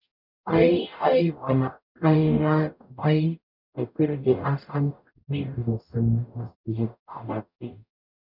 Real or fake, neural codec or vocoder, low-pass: fake; codec, 44.1 kHz, 0.9 kbps, DAC; 5.4 kHz